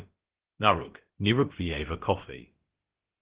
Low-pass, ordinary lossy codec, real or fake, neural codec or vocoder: 3.6 kHz; Opus, 16 kbps; fake; codec, 16 kHz, about 1 kbps, DyCAST, with the encoder's durations